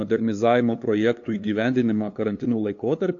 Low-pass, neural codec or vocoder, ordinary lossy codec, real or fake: 7.2 kHz; codec, 16 kHz, 2 kbps, FunCodec, trained on LibriTTS, 25 frames a second; AAC, 48 kbps; fake